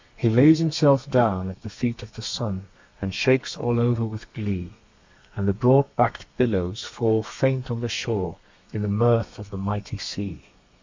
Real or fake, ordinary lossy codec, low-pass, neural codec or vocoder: fake; MP3, 64 kbps; 7.2 kHz; codec, 32 kHz, 1.9 kbps, SNAC